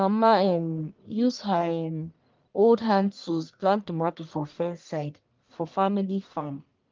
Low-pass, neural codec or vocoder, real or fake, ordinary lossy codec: 7.2 kHz; codec, 44.1 kHz, 1.7 kbps, Pupu-Codec; fake; Opus, 24 kbps